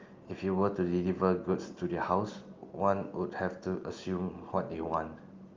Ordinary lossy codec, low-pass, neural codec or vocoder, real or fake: Opus, 24 kbps; 7.2 kHz; none; real